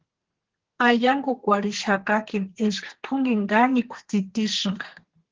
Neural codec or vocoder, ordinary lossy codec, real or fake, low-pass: codec, 32 kHz, 1.9 kbps, SNAC; Opus, 16 kbps; fake; 7.2 kHz